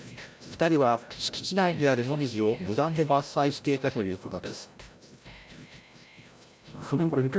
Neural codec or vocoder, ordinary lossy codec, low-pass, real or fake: codec, 16 kHz, 0.5 kbps, FreqCodec, larger model; none; none; fake